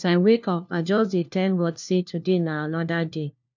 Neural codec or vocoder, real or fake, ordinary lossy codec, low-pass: codec, 16 kHz, 1 kbps, FunCodec, trained on LibriTTS, 50 frames a second; fake; none; 7.2 kHz